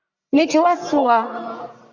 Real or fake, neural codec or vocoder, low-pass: fake; codec, 44.1 kHz, 1.7 kbps, Pupu-Codec; 7.2 kHz